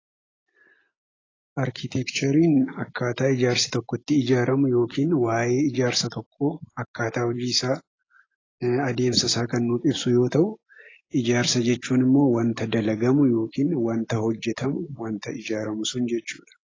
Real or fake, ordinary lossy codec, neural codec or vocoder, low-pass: real; AAC, 32 kbps; none; 7.2 kHz